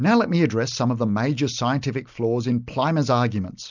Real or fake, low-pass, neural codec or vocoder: real; 7.2 kHz; none